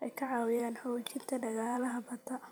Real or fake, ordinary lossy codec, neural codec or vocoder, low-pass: fake; none; vocoder, 44.1 kHz, 128 mel bands every 512 samples, BigVGAN v2; none